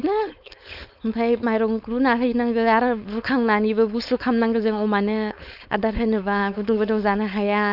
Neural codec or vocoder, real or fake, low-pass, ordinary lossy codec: codec, 16 kHz, 4.8 kbps, FACodec; fake; 5.4 kHz; none